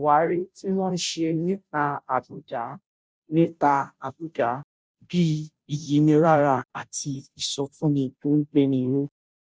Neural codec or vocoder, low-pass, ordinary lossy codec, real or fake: codec, 16 kHz, 0.5 kbps, FunCodec, trained on Chinese and English, 25 frames a second; none; none; fake